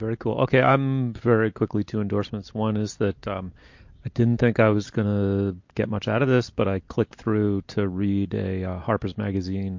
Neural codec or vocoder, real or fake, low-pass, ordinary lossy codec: none; real; 7.2 kHz; MP3, 48 kbps